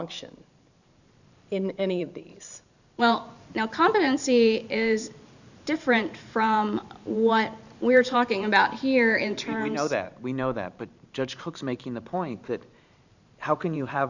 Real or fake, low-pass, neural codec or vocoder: fake; 7.2 kHz; vocoder, 44.1 kHz, 128 mel bands every 512 samples, BigVGAN v2